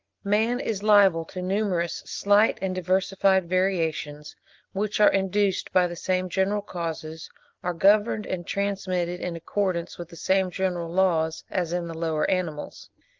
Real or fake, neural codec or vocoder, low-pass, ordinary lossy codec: real; none; 7.2 kHz; Opus, 32 kbps